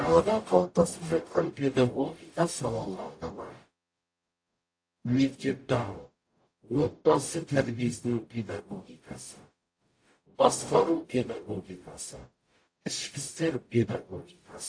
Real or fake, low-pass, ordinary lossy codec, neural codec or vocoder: fake; 9.9 kHz; AAC, 48 kbps; codec, 44.1 kHz, 0.9 kbps, DAC